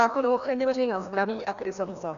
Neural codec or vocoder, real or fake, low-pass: codec, 16 kHz, 1 kbps, FreqCodec, larger model; fake; 7.2 kHz